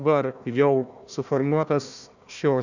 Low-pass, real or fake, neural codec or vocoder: 7.2 kHz; fake; codec, 16 kHz, 1 kbps, FunCodec, trained on Chinese and English, 50 frames a second